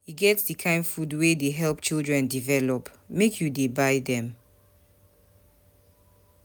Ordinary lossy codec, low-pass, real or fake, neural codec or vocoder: none; none; real; none